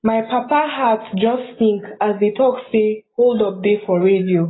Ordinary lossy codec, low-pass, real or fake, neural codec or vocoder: AAC, 16 kbps; 7.2 kHz; real; none